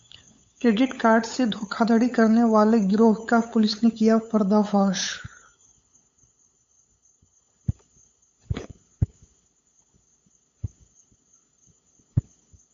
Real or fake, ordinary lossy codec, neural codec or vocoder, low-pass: fake; MP3, 48 kbps; codec, 16 kHz, 8 kbps, FunCodec, trained on LibriTTS, 25 frames a second; 7.2 kHz